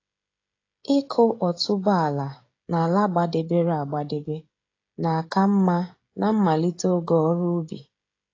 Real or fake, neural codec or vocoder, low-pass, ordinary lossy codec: fake; codec, 16 kHz, 16 kbps, FreqCodec, smaller model; 7.2 kHz; AAC, 32 kbps